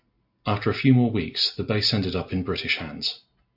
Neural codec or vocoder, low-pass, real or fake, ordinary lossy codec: none; 5.4 kHz; real; AAC, 48 kbps